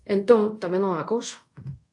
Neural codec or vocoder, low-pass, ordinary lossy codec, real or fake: codec, 24 kHz, 0.5 kbps, DualCodec; 10.8 kHz; AAC, 64 kbps; fake